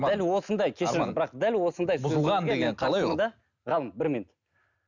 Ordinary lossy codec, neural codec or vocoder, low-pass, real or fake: none; none; 7.2 kHz; real